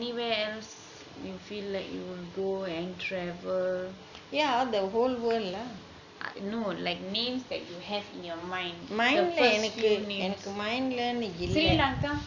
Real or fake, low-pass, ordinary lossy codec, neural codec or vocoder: real; 7.2 kHz; none; none